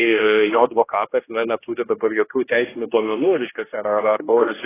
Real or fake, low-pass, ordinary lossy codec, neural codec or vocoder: fake; 3.6 kHz; AAC, 16 kbps; codec, 16 kHz, 1 kbps, X-Codec, HuBERT features, trained on general audio